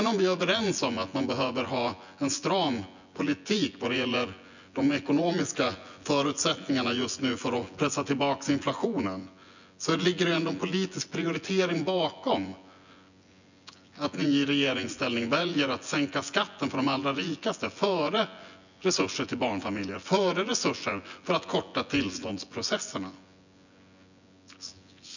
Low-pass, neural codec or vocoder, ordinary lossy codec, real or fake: 7.2 kHz; vocoder, 24 kHz, 100 mel bands, Vocos; none; fake